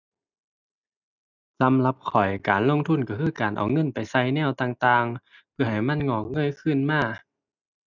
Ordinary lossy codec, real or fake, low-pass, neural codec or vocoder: none; real; 7.2 kHz; none